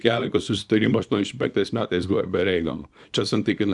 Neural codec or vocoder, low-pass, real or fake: codec, 24 kHz, 0.9 kbps, WavTokenizer, small release; 10.8 kHz; fake